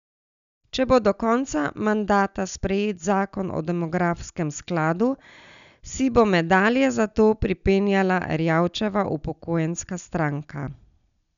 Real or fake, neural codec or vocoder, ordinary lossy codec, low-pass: real; none; none; 7.2 kHz